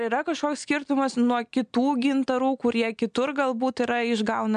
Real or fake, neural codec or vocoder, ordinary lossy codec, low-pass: real; none; MP3, 96 kbps; 9.9 kHz